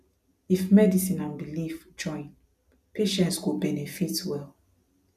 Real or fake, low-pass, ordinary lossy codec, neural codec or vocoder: real; 14.4 kHz; none; none